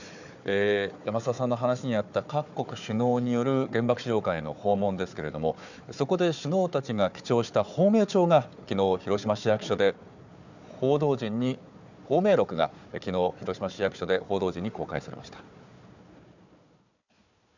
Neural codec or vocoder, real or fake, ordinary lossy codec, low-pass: codec, 16 kHz, 4 kbps, FunCodec, trained on Chinese and English, 50 frames a second; fake; none; 7.2 kHz